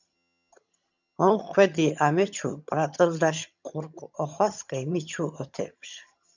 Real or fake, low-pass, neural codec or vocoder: fake; 7.2 kHz; vocoder, 22.05 kHz, 80 mel bands, HiFi-GAN